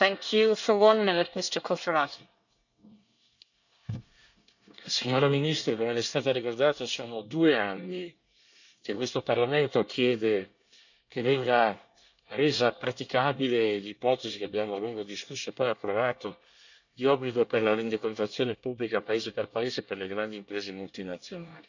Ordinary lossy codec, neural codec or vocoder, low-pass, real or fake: none; codec, 24 kHz, 1 kbps, SNAC; 7.2 kHz; fake